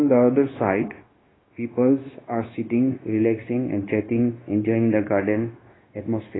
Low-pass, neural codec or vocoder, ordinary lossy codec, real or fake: 7.2 kHz; codec, 16 kHz, 0.9 kbps, LongCat-Audio-Codec; AAC, 16 kbps; fake